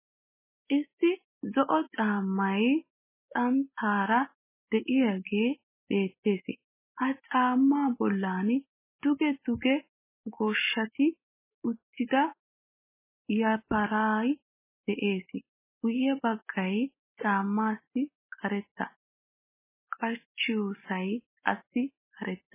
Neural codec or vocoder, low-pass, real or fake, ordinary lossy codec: none; 3.6 kHz; real; MP3, 16 kbps